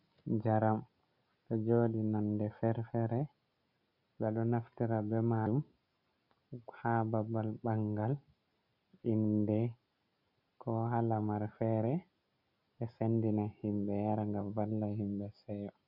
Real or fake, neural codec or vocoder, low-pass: real; none; 5.4 kHz